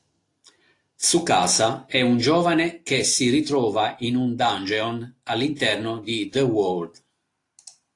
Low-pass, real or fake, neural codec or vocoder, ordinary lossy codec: 10.8 kHz; real; none; AAC, 48 kbps